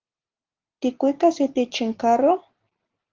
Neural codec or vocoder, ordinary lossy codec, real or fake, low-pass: none; Opus, 32 kbps; real; 7.2 kHz